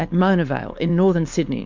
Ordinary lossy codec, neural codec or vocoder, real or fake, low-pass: MP3, 64 kbps; codec, 16 kHz, 4.8 kbps, FACodec; fake; 7.2 kHz